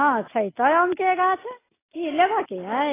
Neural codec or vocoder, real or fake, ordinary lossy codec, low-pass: none; real; AAC, 16 kbps; 3.6 kHz